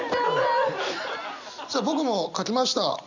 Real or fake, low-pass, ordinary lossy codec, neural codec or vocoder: real; 7.2 kHz; none; none